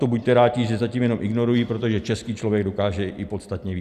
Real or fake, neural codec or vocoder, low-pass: real; none; 14.4 kHz